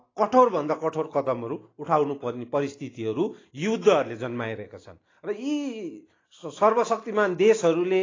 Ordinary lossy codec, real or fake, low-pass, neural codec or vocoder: AAC, 32 kbps; real; 7.2 kHz; none